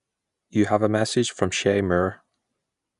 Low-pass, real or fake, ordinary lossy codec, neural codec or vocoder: 10.8 kHz; real; none; none